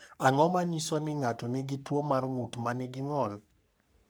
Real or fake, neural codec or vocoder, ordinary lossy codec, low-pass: fake; codec, 44.1 kHz, 3.4 kbps, Pupu-Codec; none; none